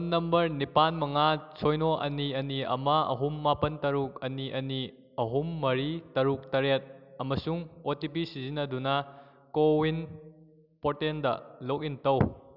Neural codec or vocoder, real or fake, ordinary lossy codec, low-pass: none; real; none; 5.4 kHz